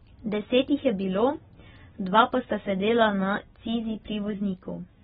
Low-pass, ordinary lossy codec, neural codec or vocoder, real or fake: 19.8 kHz; AAC, 16 kbps; none; real